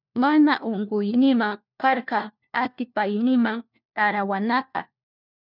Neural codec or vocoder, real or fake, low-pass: codec, 16 kHz, 1 kbps, FunCodec, trained on LibriTTS, 50 frames a second; fake; 5.4 kHz